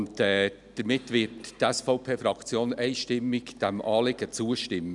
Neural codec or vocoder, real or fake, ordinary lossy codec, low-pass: none; real; none; 10.8 kHz